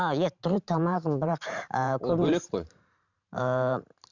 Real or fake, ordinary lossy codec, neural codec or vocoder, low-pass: fake; none; vocoder, 44.1 kHz, 128 mel bands every 512 samples, BigVGAN v2; 7.2 kHz